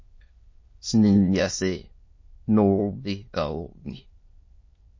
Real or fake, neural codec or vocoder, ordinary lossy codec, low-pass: fake; autoencoder, 22.05 kHz, a latent of 192 numbers a frame, VITS, trained on many speakers; MP3, 32 kbps; 7.2 kHz